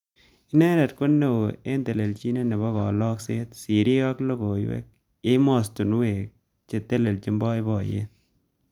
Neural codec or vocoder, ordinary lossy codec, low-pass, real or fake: vocoder, 44.1 kHz, 128 mel bands every 512 samples, BigVGAN v2; none; 19.8 kHz; fake